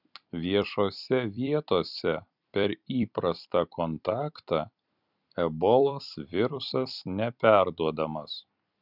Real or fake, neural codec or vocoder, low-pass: fake; vocoder, 44.1 kHz, 128 mel bands every 256 samples, BigVGAN v2; 5.4 kHz